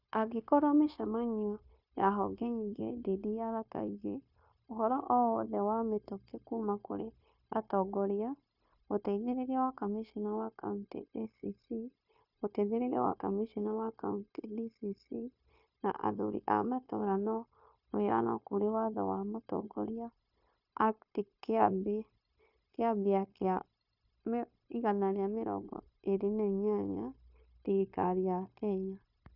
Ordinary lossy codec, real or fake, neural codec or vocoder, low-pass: none; fake; codec, 16 kHz, 0.9 kbps, LongCat-Audio-Codec; 5.4 kHz